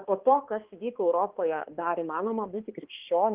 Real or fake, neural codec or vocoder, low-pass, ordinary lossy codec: fake; codec, 16 kHz, 2 kbps, X-Codec, HuBERT features, trained on balanced general audio; 3.6 kHz; Opus, 16 kbps